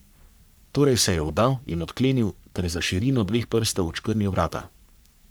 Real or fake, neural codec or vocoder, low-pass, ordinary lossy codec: fake; codec, 44.1 kHz, 3.4 kbps, Pupu-Codec; none; none